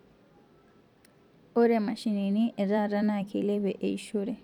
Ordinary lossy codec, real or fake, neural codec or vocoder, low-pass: none; fake; vocoder, 44.1 kHz, 128 mel bands every 256 samples, BigVGAN v2; 19.8 kHz